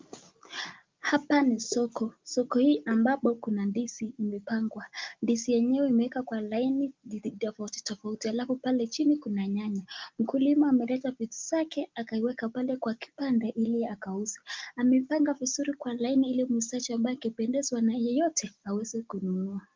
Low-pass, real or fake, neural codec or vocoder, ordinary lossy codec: 7.2 kHz; real; none; Opus, 32 kbps